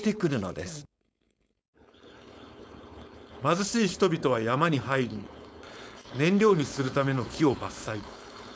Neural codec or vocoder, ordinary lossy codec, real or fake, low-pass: codec, 16 kHz, 4.8 kbps, FACodec; none; fake; none